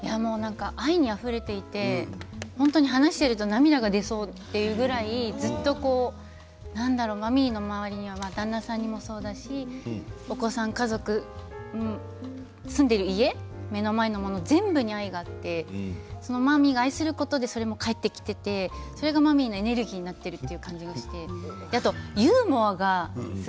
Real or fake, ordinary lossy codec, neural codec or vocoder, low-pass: real; none; none; none